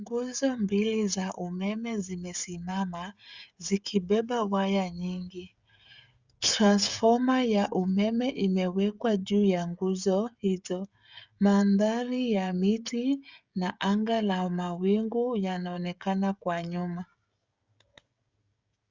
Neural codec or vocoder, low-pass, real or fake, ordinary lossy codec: codec, 16 kHz, 16 kbps, FreqCodec, smaller model; 7.2 kHz; fake; Opus, 64 kbps